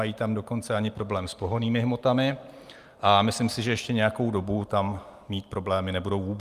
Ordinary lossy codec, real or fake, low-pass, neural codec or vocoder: Opus, 32 kbps; real; 14.4 kHz; none